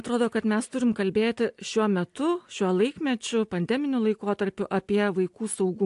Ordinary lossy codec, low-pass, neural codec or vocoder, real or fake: AAC, 64 kbps; 10.8 kHz; none; real